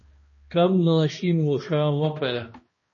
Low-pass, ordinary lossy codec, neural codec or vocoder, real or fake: 7.2 kHz; MP3, 32 kbps; codec, 16 kHz, 1 kbps, X-Codec, HuBERT features, trained on balanced general audio; fake